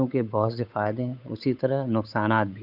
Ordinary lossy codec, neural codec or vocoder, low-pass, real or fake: none; codec, 16 kHz, 8 kbps, FunCodec, trained on Chinese and English, 25 frames a second; 5.4 kHz; fake